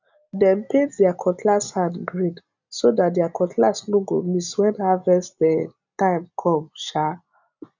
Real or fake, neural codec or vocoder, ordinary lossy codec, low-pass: real; none; none; 7.2 kHz